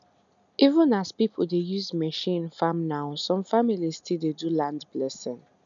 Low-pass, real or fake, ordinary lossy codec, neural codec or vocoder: 7.2 kHz; real; none; none